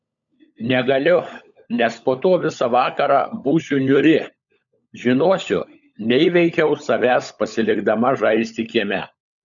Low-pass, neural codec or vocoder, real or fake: 7.2 kHz; codec, 16 kHz, 16 kbps, FunCodec, trained on LibriTTS, 50 frames a second; fake